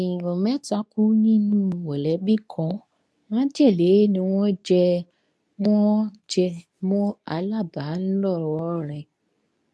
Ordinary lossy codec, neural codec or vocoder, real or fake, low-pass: none; codec, 24 kHz, 0.9 kbps, WavTokenizer, medium speech release version 2; fake; none